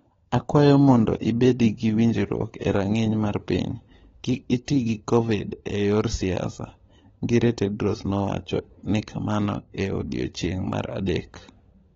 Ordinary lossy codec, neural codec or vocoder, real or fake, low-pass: AAC, 24 kbps; codec, 16 kHz, 16 kbps, FunCodec, trained on LibriTTS, 50 frames a second; fake; 7.2 kHz